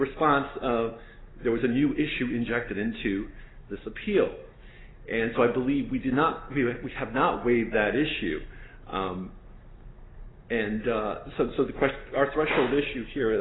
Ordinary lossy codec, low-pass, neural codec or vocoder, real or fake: AAC, 16 kbps; 7.2 kHz; none; real